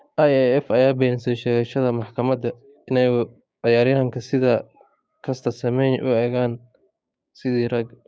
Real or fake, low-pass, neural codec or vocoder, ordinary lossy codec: fake; none; codec, 16 kHz, 6 kbps, DAC; none